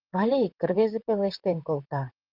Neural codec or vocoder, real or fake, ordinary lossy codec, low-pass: vocoder, 44.1 kHz, 128 mel bands, Pupu-Vocoder; fake; Opus, 24 kbps; 5.4 kHz